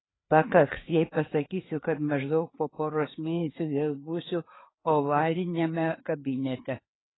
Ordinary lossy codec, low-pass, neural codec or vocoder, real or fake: AAC, 16 kbps; 7.2 kHz; codec, 16 kHz, 4 kbps, FreqCodec, larger model; fake